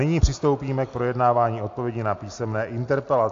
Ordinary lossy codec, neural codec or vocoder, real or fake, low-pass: AAC, 48 kbps; none; real; 7.2 kHz